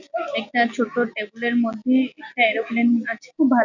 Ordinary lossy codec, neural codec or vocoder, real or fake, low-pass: none; none; real; 7.2 kHz